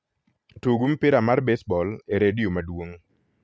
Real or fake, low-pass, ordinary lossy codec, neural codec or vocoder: real; none; none; none